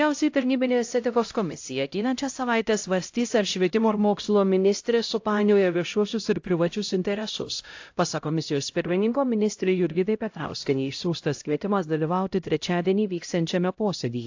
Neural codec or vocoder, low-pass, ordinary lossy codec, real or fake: codec, 16 kHz, 0.5 kbps, X-Codec, HuBERT features, trained on LibriSpeech; 7.2 kHz; AAC, 48 kbps; fake